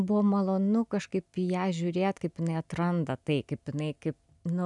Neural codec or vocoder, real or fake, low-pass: none; real; 10.8 kHz